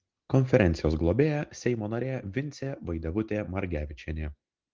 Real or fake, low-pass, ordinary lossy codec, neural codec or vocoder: real; 7.2 kHz; Opus, 16 kbps; none